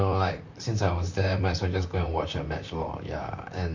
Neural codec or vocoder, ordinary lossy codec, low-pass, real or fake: vocoder, 44.1 kHz, 128 mel bands, Pupu-Vocoder; MP3, 48 kbps; 7.2 kHz; fake